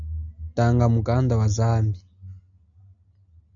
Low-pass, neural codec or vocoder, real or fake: 7.2 kHz; none; real